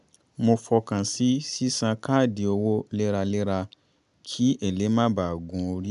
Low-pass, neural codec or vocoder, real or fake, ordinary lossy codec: 10.8 kHz; none; real; MP3, 96 kbps